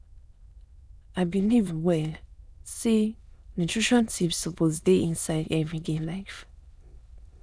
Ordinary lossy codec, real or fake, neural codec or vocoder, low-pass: none; fake; autoencoder, 22.05 kHz, a latent of 192 numbers a frame, VITS, trained on many speakers; none